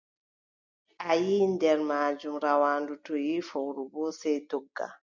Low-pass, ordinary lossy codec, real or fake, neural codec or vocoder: 7.2 kHz; MP3, 64 kbps; real; none